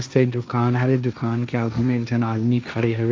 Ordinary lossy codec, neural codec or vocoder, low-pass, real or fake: none; codec, 16 kHz, 1.1 kbps, Voila-Tokenizer; none; fake